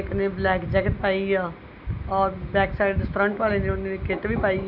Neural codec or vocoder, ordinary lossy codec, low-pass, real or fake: none; none; 5.4 kHz; real